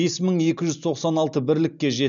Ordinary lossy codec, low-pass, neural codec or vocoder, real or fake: none; 7.2 kHz; none; real